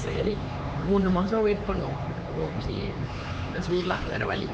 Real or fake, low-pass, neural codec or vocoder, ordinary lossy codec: fake; none; codec, 16 kHz, 4 kbps, X-Codec, HuBERT features, trained on LibriSpeech; none